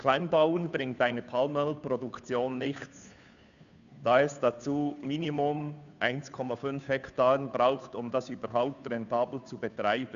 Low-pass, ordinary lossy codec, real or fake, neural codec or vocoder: 7.2 kHz; none; fake; codec, 16 kHz, 2 kbps, FunCodec, trained on Chinese and English, 25 frames a second